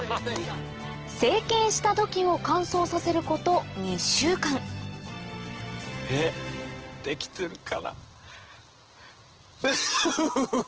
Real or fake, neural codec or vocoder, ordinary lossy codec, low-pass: real; none; Opus, 16 kbps; 7.2 kHz